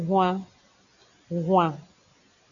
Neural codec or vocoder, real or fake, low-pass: none; real; 7.2 kHz